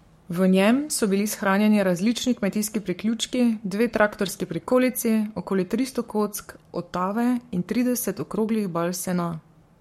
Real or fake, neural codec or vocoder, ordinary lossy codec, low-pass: fake; codec, 44.1 kHz, 7.8 kbps, Pupu-Codec; MP3, 64 kbps; 19.8 kHz